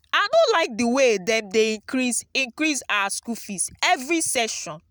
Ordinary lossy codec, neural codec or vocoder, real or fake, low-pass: none; none; real; none